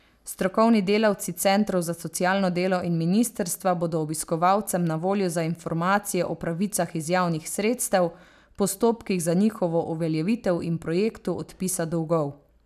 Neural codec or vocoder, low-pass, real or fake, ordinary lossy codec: none; 14.4 kHz; real; none